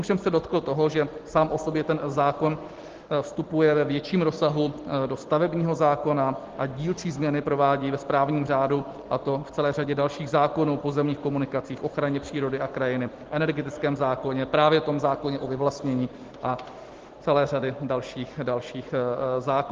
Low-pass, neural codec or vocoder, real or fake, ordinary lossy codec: 7.2 kHz; none; real; Opus, 16 kbps